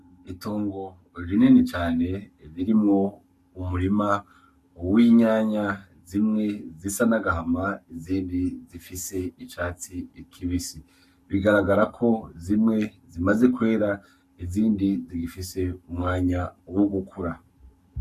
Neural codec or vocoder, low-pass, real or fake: codec, 44.1 kHz, 7.8 kbps, Pupu-Codec; 14.4 kHz; fake